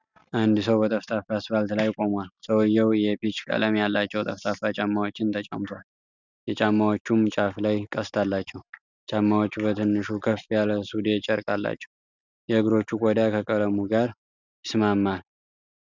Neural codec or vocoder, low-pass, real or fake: none; 7.2 kHz; real